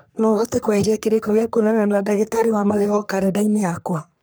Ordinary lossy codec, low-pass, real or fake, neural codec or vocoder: none; none; fake; codec, 44.1 kHz, 3.4 kbps, Pupu-Codec